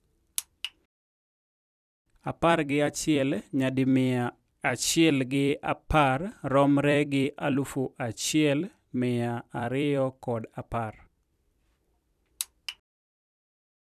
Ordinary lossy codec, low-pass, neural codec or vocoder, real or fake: none; 14.4 kHz; vocoder, 44.1 kHz, 128 mel bands every 256 samples, BigVGAN v2; fake